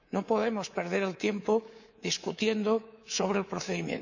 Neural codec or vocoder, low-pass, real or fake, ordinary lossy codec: codec, 24 kHz, 3.1 kbps, DualCodec; 7.2 kHz; fake; none